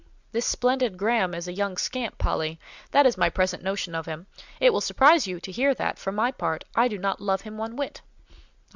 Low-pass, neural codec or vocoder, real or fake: 7.2 kHz; none; real